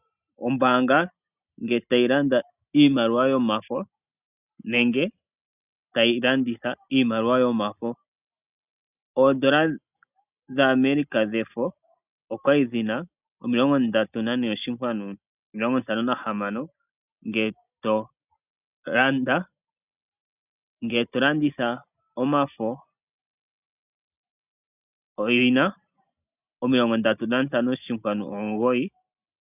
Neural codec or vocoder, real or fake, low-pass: none; real; 3.6 kHz